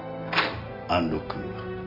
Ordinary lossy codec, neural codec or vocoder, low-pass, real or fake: MP3, 32 kbps; none; 5.4 kHz; real